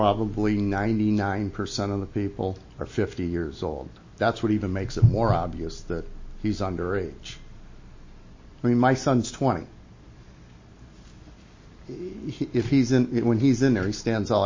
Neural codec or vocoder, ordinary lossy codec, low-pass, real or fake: none; MP3, 32 kbps; 7.2 kHz; real